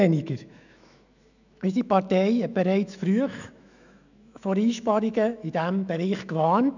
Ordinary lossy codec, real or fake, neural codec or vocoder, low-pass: none; fake; autoencoder, 48 kHz, 128 numbers a frame, DAC-VAE, trained on Japanese speech; 7.2 kHz